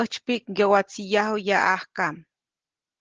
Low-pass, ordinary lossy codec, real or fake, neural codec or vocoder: 7.2 kHz; Opus, 16 kbps; real; none